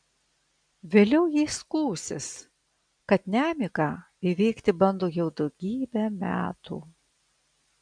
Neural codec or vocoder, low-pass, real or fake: none; 9.9 kHz; real